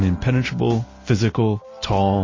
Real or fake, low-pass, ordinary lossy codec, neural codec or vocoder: real; 7.2 kHz; MP3, 32 kbps; none